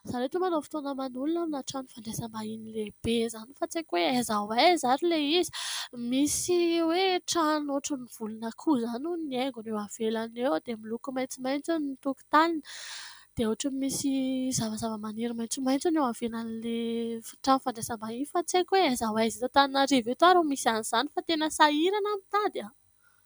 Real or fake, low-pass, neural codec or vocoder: real; 19.8 kHz; none